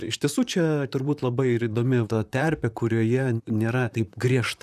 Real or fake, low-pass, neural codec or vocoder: fake; 14.4 kHz; vocoder, 44.1 kHz, 128 mel bands, Pupu-Vocoder